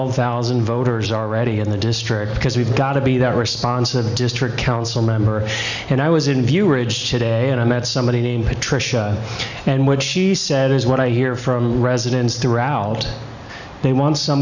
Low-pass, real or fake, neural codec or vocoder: 7.2 kHz; real; none